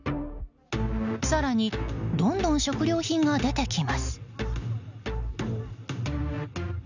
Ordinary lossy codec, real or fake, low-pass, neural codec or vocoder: none; real; 7.2 kHz; none